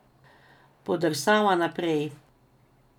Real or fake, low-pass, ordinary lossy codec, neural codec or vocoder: real; 19.8 kHz; none; none